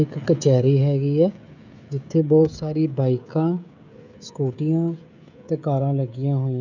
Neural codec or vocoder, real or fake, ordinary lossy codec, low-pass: codec, 16 kHz, 16 kbps, FreqCodec, smaller model; fake; none; 7.2 kHz